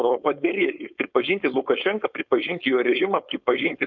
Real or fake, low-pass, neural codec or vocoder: fake; 7.2 kHz; codec, 16 kHz, 4.8 kbps, FACodec